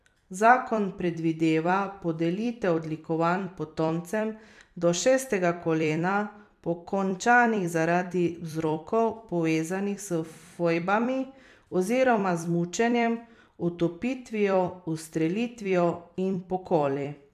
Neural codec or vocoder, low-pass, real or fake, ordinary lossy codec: vocoder, 44.1 kHz, 128 mel bands every 256 samples, BigVGAN v2; 14.4 kHz; fake; none